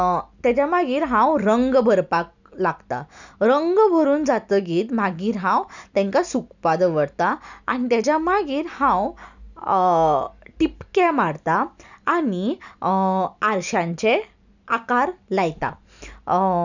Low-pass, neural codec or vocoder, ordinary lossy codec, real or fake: 7.2 kHz; none; none; real